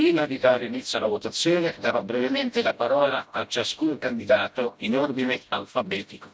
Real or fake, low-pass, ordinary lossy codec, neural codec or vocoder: fake; none; none; codec, 16 kHz, 0.5 kbps, FreqCodec, smaller model